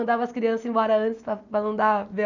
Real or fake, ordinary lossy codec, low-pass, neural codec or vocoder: real; none; 7.2 kHz; none